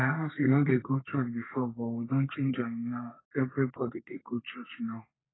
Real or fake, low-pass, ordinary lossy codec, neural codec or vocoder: fake; 7.2 kHz; AAC, 16 kbps; codec, 32 kHz, 1.9 kbps, SNAC